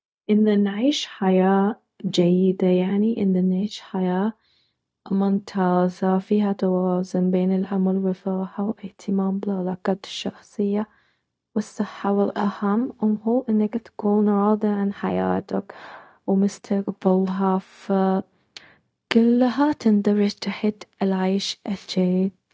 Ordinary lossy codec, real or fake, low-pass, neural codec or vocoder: none; fake; none; codec, 16 kHz, 0.4 kbps, LongCat-Audio-Codec